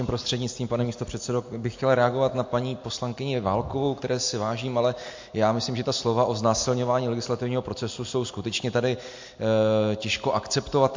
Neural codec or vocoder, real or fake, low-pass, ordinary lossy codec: none; real; 7.2 kHz; MP3, 48 kbps